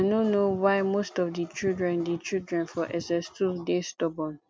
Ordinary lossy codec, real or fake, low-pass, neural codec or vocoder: none; real; none; none